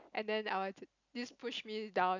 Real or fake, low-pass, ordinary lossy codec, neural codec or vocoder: real; 7.2 kHz; none; none